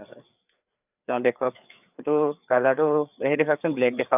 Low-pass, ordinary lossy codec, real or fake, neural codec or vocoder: 3.6 kHz; none; fake; codec, 16 kHz, 4 kbps, FreqCodec, larger model